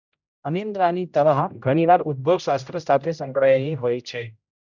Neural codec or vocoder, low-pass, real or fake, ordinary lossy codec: codec, 16 kHz, 0.5 kbps, X-Codec, HuBERT features, trained on general audio; 7.2 kHz; fake; none